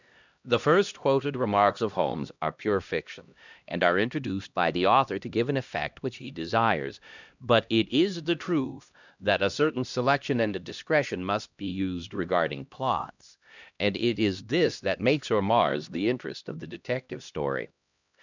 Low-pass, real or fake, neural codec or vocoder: 7.2 kHz; fake; codec, 16 kHz, 1 kbps, X-Codec, HuBERT features, trained on LibriSpeech